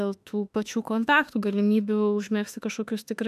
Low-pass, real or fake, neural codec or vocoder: 14.4 kHz; fake; autoencoder, 48 kHz, 32 numbers a frame, DAC-VAE, trained on Japanese speech